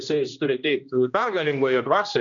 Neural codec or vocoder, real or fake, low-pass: codec, 16 kHz, 1 kbps, X-Codec, HuBERT features, trained on general audio; fake; 7.2 kHz